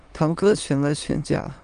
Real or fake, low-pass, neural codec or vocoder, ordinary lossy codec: fake; 9.9 kHz; autoencoder, 22.05 kHz, a latent of 192 numbers a frame, VITS, trained on many speakers; Opus, 32 kbps